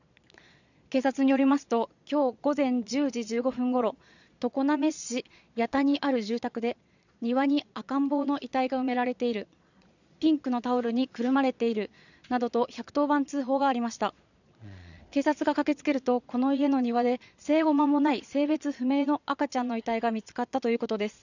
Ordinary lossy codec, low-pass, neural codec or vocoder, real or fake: none; 7.2 kHz; vocoder, 22.05 kHz, 80 mel bands, Vocos; fake